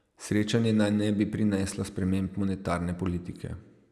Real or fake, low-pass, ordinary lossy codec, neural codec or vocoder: real; none; none; none